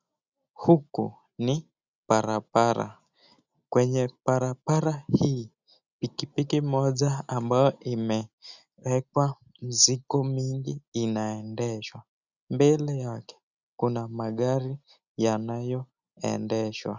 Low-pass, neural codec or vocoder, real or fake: 7.2 kHz; none; real